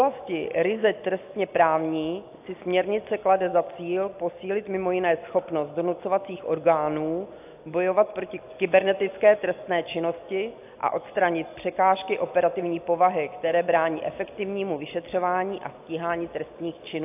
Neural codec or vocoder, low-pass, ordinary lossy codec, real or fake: none; 3.6 kHz; AAC, 32 kbps; real